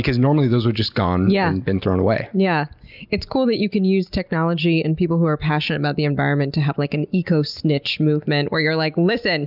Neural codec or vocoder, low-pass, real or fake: none; 5.4 kHz; real